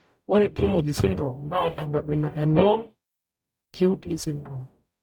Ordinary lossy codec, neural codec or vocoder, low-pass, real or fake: MP3, 96 kbps; codec, 44.1 kHz, 0.9 kbps, DAC; 19.8 kHz; fake